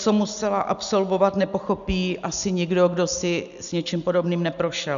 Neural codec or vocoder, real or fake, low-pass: none; real; 7.2 kHz